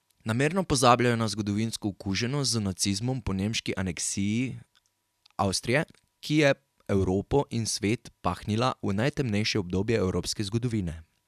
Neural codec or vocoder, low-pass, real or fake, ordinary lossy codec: none; 14.4 kHz; real; none